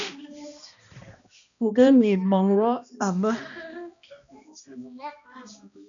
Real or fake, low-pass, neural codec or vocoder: fake; 7.2 kHz; codec, 16 kHz, 1 kbps, X-Codec, HuBERT features, trained on balanced general audio